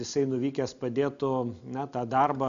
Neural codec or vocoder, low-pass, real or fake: none; 7.2 kHz; real